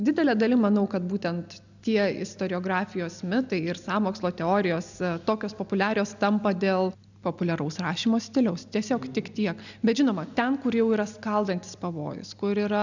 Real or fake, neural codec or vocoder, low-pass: real; none; 7.2 kHz